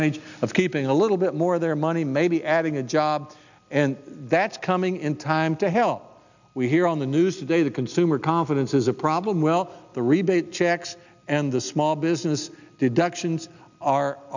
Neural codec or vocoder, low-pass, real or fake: none; 7.2 kHz; real